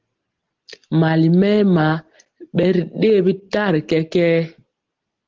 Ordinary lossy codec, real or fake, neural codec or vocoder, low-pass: Opus, 16 kbps; real; none; 7.2 kHz